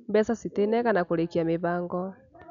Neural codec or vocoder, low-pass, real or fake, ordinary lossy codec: none; 7.2 kHz; real; none